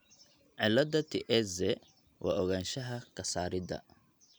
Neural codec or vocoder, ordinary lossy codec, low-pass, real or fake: none; none; none; real